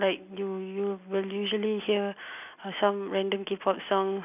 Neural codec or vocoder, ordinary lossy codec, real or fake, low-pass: none; none; real; 3.6 kHz